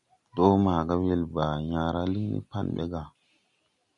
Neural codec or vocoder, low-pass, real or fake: none; 10.8 kHz; real